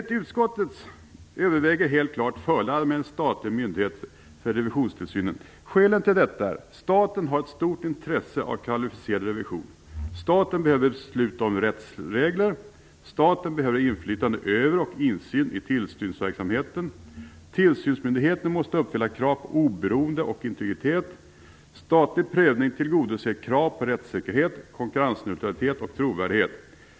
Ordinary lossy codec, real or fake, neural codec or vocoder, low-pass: none; real; none; none